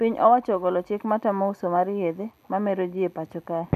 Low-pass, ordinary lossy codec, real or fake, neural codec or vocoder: 14.4 kHz; none; real; none